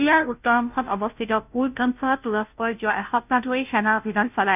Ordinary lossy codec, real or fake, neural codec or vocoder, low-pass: none; fake; codec, 16 kHz, 0.5 kbps, FunCodec, trained on Chinese and English, 25 frames a second; 3.6 kHz